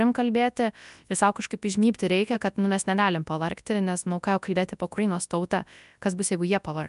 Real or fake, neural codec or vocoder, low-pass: fake; codec, 24 kHz, 0.9 kbps, WavTokenizer, large speech release; 10.8 kHz